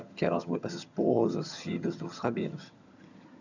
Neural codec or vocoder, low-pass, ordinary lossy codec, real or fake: vocoder, 22.05 kHz, 80 mel bands, HiFi-GAN; 7.2 kHz; none; fake